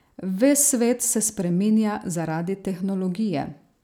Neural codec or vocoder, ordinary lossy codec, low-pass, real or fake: none; none; none; real